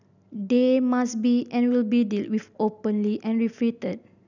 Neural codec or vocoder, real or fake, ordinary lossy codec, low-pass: none; real; none; 7.2 kHz